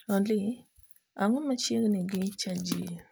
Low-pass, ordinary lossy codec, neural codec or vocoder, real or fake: none; none; none; real